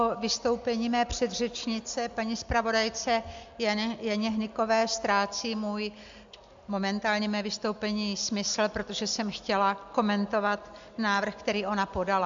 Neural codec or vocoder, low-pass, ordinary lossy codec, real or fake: none; 7.2 kHz; AAC, 64 kbps; real